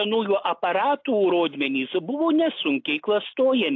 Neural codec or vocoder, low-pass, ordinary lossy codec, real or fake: none; 7.2 kHz; AAC, 48 kbps; real